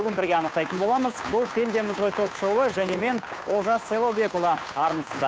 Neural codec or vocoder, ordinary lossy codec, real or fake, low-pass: codec, 16 kHz, 2 kbps, FunCodec, trained on Chinese and English, 25 frames a second; none; fake; none